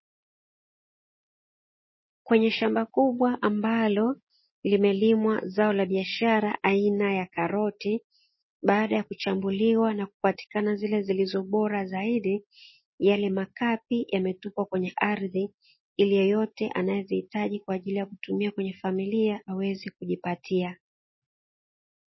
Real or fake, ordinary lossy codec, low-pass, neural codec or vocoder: real; MP3, 24 kbps; 7.2 kHz; none